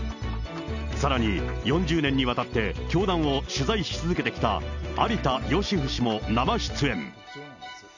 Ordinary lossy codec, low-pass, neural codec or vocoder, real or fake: none; 7.2 kHz; none; real